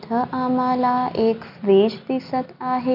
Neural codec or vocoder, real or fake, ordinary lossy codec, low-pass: none; real; none; 5.4 kHz